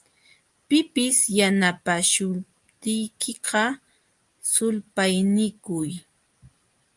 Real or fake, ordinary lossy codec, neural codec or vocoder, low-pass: real; Opus, 32 kbps; none; 10.8 kHz